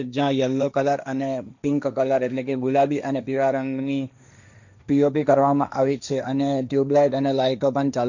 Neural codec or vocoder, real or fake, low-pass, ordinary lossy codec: codec, 16 kHz, 1.1 kbps, Voila-Tokenizer; fake; 7.2 kHz; none